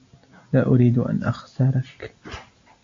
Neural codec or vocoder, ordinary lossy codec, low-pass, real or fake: none; AAC, 48 kbps; 7.2 kHz; real